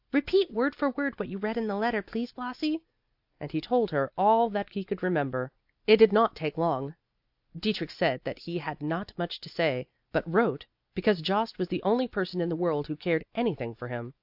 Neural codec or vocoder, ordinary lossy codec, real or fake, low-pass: none; AAC, 48 kbps; real; 5.4 kHz